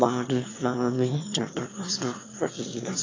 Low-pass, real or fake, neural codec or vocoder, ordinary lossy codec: 7.2 kHz; fake; autoencoder, 22.05 kHz, a latent of 192 numbers a frame, VITS, trained on one speaker; AAC, 32 kbps